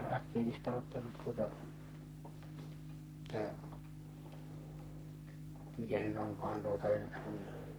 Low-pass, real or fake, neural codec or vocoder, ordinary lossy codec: none; fake; codec, 44.1 kHz, 3.4 kbps, Pupu-Codec; none